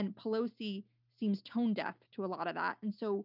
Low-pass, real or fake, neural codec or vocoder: 5.4 kHz; real; none